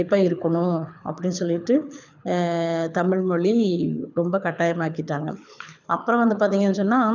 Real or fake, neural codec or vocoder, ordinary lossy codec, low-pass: fake; codec, 24 kHz, 6 kbps, HILCodec; none; 7.2 kHz